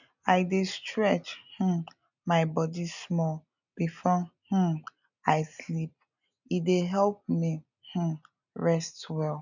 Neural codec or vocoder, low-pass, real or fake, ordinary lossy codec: none; 7.2 kHz; real; none